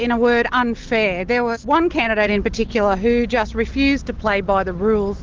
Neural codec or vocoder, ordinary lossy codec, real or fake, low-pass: none; Opus, 16 kbps; real; 7.2 kHz